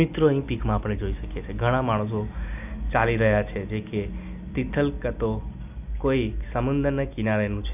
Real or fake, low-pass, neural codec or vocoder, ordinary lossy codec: real; 3.6 kHz; none; none